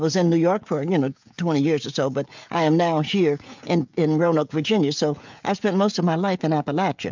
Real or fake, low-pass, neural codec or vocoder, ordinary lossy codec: fake; 7.2 kHz; codec, 16 kHz, 16 kbps, FreqCodec, smaller model; MP3, 64 kbps